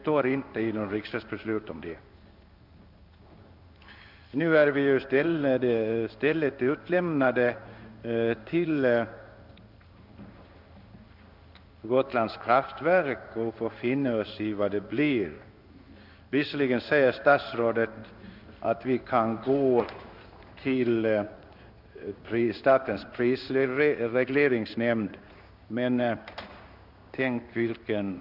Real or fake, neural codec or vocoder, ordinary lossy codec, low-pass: fake; codec, 16 kHz in and 24 kHz out, 1 kbps, XY-Tokenizer; none; 5.4 kHz